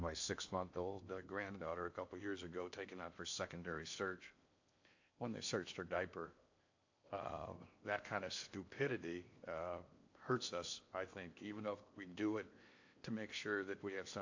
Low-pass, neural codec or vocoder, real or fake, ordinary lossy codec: 7.2 kHz; codec, 16 kHz in and 24 kHz out, 0.8 kbps, FocalCodec, streaming, 65536 codes; fake; AAC, 48 kbps